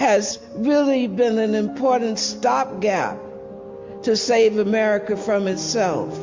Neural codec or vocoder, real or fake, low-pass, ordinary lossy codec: none; real; 7.2 kHz; MP3, 48 kbps